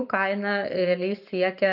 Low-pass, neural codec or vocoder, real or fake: 5.4 kHz; none; real